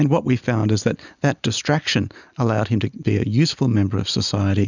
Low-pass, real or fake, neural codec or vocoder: 7.2 kHz; real; none